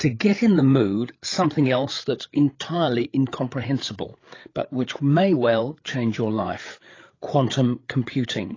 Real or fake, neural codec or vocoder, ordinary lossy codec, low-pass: fake; codec, 16 kHz, 8 kbps, FreqCodec, larger model; AAC, 32 kbps; 7.2 kHz